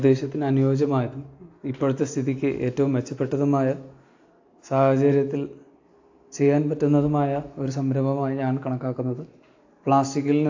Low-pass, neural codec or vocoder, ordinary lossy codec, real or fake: 7.2 kHz; none; AAC, 48 kbps; real